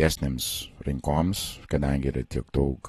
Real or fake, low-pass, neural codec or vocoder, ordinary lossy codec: real; 19.8 kHz; none; AAC, 32 kbps